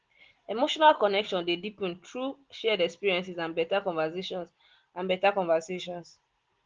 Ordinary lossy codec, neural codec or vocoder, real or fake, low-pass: Opus, 16 kbps; none; real; 7.2 kHz